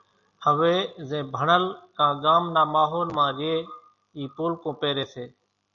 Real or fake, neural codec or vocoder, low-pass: real; none; 7.2 kHz